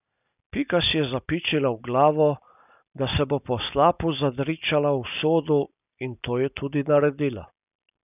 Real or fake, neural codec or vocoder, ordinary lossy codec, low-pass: real; none; none; 3.6 kHz